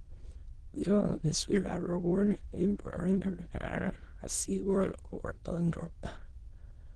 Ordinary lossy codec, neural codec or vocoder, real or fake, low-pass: Opus, 16 kbps; autoencoder, 22.05 kHz, a latent of 192 numbers a frame, VITS, trained on many speakers; fake; 9.9 kHz